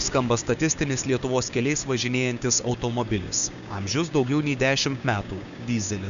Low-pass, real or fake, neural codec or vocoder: 7.2 kHz; fake; codec, 16 kHz, 6 kbps, DAC